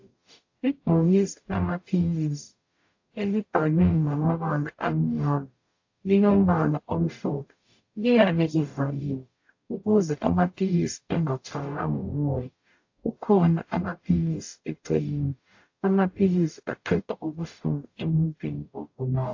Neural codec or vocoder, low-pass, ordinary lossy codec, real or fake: codec, 44.1 kHz, 0.9 kbps, DAC; 7.2 kHz; AAC, 48 kbps; fake